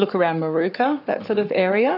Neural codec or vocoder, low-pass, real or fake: codec, 44.1 kHz, 7.8 kbps, Pupu-Codec; 5.4 kHz; fake